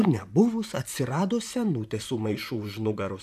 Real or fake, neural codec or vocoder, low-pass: fake; vocoder, 44.1 kHz, 128 mel bands, Pupu-Vocoder; 14.4 kHz